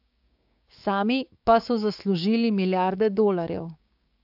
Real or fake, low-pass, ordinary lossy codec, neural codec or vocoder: fake; 5.4 kHz; AAC, 48 kbps; codec, 16 kHz, 6 kbps, DAC